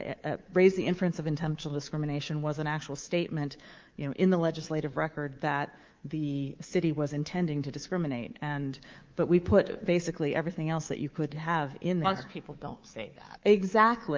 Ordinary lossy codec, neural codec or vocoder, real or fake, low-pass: Opus, 32 kbps; codec, 24 kHz, 3.1 kbps, DualCodec; fake; 7.2 kHz